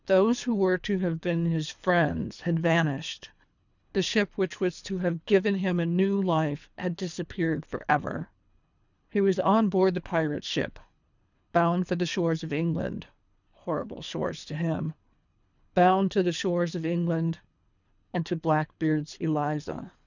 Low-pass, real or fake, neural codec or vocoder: 7.2 kHz; fake; codec, 24 kHz, 3 kbps, HILCodec